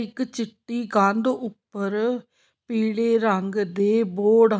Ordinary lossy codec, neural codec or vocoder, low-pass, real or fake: none; none; none; real